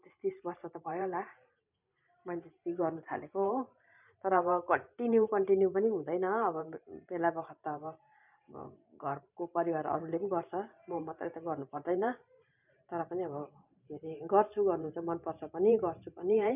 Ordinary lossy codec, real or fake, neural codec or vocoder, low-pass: none; fake; vocoder, 44.1 kHz, 128 mel bands every 512 samples, BigVGAN v2; 3.6 kHz